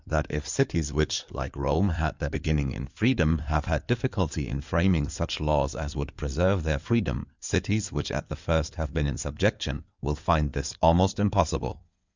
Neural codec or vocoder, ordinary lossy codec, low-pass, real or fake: codec, 16 kHz in and 24 kHz out, 2.2 kbps, FireRedTTS-2 codec; Opus, 64 kbps; 7.2 kHz; fake